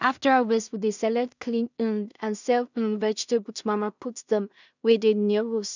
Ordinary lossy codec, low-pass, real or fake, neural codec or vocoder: none; 7.2 kHz; fake; codec, 16 kHz in and 24 kHz out, 0.4 kbps, LongCat-Audio-Codec, two codebook decoder